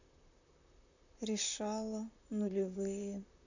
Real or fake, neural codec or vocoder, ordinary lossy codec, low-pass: fake; vocoder, 44.1 kHz, 128 mel bands, Pupu-Vocoder; none; 7.2 kHz